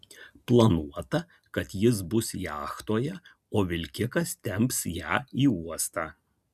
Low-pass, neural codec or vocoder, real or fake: 14.4 kHz; none; real